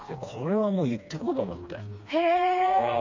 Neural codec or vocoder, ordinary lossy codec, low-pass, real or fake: codec, 16 kHz, 2 kbps, FreqCodec, smaller model; MP3, 48 kbps; 7.2 kHz; fake